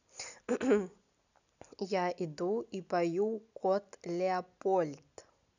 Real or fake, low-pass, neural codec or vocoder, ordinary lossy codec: real; 7.2 kHz; none; none